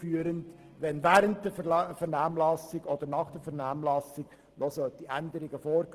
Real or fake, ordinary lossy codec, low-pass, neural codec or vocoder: real; Opus, 16 kbps; 14.4 kHz; none